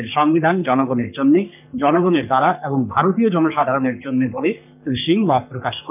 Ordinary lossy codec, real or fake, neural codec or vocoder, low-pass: none; fake; codec, 44.1 kHz, 3.4 kbps, Pupu-Codec; 3.6 kHz